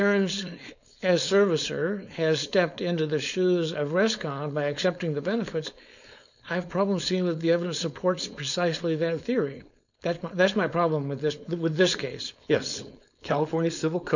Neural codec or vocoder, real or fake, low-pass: codec, 16 kHz, 4.8 kbps, FACodec; fake; 7.2 kHz